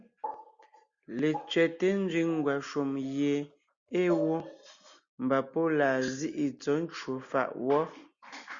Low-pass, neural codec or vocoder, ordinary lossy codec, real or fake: 7.2 kHz; none; Opus, 64 kbps; real